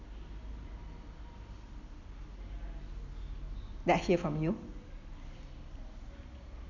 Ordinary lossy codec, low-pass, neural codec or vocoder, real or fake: none; 7.2 kHz; none; real